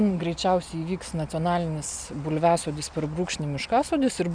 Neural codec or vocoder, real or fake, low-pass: none; real; 9.9 kHz